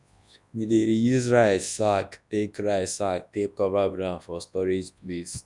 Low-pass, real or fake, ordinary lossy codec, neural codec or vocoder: 10.8 kHz; fake; none; codec, 24 kHz, 0.9 kbps, WavTokenizer, large speech release